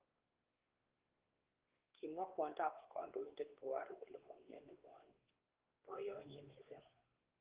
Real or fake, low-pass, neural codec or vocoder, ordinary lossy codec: fake; 3.6 kHz; codec, 16 kHz, 2 kbps, X-Codec, WavLM features, trained on Multilingual LibriSpeech; Opus, 16 kbps